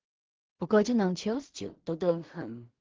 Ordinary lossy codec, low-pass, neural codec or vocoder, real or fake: Opus, 16 kbps; 7.2 kHz; codec, 16 kHz in and 24 kHz out, 0.4 kbps, LongCat-Audio-Codec, two codebook decoder; fake